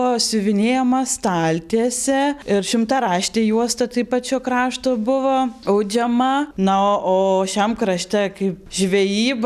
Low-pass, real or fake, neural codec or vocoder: 14.4 kHz; real; none